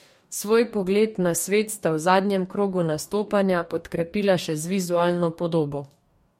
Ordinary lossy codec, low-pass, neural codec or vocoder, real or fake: MP3, 64 kbps; 19.8 kHz; codec, 44.1 kHz, 2.6 kbps, DAC; fake